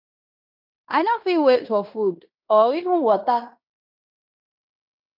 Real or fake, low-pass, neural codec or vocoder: fake; 5.4 kHz; codec, 16 kHz in and 24 kHz out, 0.9 kbps, LongCat-Audio-Codec, fine tuned four codebook decoder